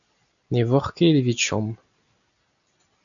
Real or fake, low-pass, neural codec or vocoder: real; 7.2 kHz; none